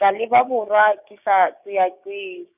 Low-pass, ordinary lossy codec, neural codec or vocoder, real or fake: 3.6 kHz; none; codec, 16 kHz, 6 kbps, DAC; fake